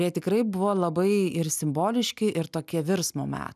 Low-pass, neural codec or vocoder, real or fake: 14.4 kHz; none; real